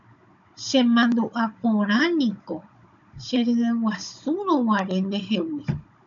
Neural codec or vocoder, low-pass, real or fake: codec, 16 kHz, 16 kbps, FunCodec, trained on Chinese and English, 50 frames a second; 7.2 kHz; fake